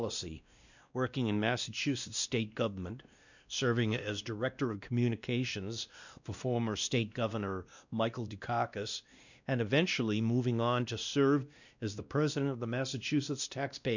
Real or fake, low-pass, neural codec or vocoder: fake; 7.2 kHz; codec, 16 kHz, 1 kbps, X-Codec, WavLM features, trained on Multilingual LibriSpeech